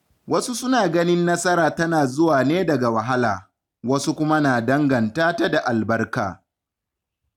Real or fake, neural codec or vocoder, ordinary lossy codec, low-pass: real; none; none; 19.8 kHz